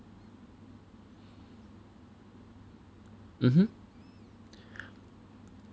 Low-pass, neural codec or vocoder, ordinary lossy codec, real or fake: none; none; none; real